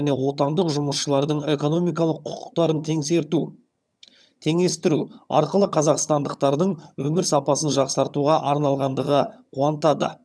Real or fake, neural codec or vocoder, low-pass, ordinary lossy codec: fake; vocoder, 22.05 kHz, 80 mel bands, HiFi-GAN; none; none